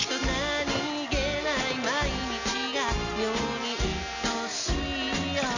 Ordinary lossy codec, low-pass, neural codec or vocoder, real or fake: none; 7.2 kHz; none; real